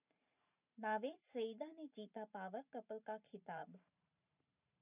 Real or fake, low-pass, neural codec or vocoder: fake; 3.6 kHz; vocoder, 44.1 kHz, 128 mel bands every 512 samples, BigVGAN v2